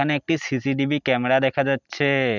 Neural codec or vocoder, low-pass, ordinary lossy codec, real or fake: none; 7.2 kHz; none; real